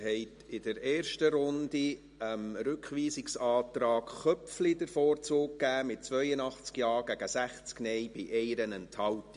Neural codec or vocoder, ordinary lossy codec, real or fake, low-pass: none; MP3, 48 kbps; real; 10.8 kHz